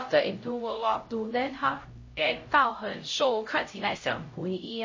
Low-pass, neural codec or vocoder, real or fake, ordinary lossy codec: 7.2 kHz; codec, 16 kHz, 0.5 kbps, X-Codec, HuBERT features, trained on LibriSpeech; fake; MP3, 32 kbps